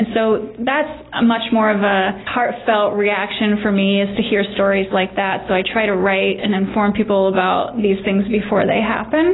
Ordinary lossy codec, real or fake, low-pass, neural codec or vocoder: AAC, 16 kbps; real; 7.2 kHz; none